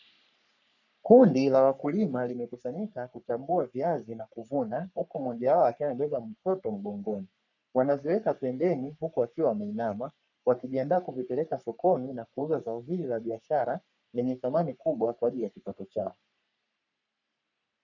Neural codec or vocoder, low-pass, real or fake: codec, 44.1 kHz, 3.4 kbps, Pupu-Codec; 7.2 kHz; fake